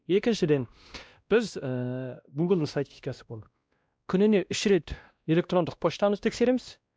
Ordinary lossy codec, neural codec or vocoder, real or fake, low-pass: none; codec, 16 kHz, 1 kbps, X-Codec, WavLM features, trained on Multilingual LibriSpeech; fake; none